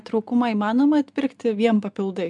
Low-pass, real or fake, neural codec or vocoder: 10.8 kHz; real; none